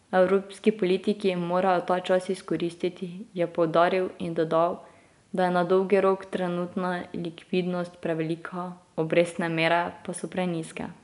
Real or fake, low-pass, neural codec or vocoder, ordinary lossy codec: real; 10.8 kHz; none; none